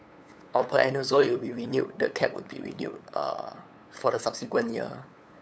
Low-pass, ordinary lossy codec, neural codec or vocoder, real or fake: none; none; codec, 16 kHz, 8 kbps, FunCodec, trained on LibriTTS, 25 frames a second; fake